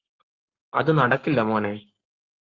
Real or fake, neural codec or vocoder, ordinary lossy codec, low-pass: fake; codec, 44.1 kHz, 7.8 kbps, Pupu-Codec; Opus, 24 kbps; 7.2 kHz